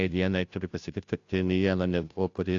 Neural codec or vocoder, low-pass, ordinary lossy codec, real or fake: codec, 16 kHz, 0.5 kbps, FunCodec, trained on Chinese and English, 25 frames a second; 7.2 kHz; AAC, 48 kbps; fake